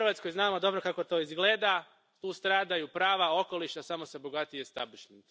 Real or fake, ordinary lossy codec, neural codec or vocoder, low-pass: real; none; none; none